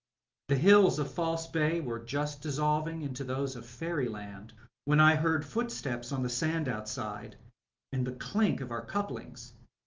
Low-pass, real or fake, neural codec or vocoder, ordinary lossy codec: 7.2 kHz; real; none; Opus, 24 kbps